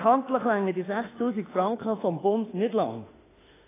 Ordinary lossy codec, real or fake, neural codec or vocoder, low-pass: AAC, 16 kbps; fake; autoencoder, 48 kHz, 32 numbers a frame, DAC-VAE, trained on Japanese speech; 3.6 kHz